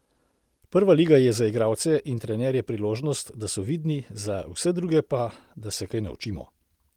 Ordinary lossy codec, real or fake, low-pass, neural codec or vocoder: Opus, 24 kbps; real; 19.8 kHz; none